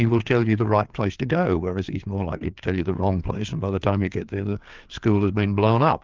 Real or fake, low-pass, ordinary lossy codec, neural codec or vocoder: fake; 7.2 kHz; Opus, 24 kbps; codec, 16 kHz, 4 kbps, FreqCodec, larger model